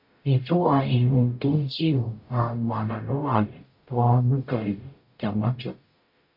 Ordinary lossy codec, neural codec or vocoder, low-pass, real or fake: MP3, 32 kbps; codec, 44.1 kHz, 0.9 kbps, DAC; 5.4 kHz; fake